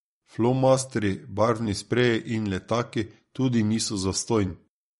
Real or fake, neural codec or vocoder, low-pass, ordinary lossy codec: fake; vocoder, 44.1 kHz, 128 mel bands every 256 samples, BigVGAN v2; 19.8 kHz; MP3, 48 kbps